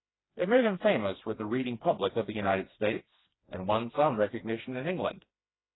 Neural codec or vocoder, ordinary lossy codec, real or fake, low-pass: codec, 16 kHz, 2 kbps, FreqCodec, smaller model; AAC, 16 kbps; fake; 7.2 kHz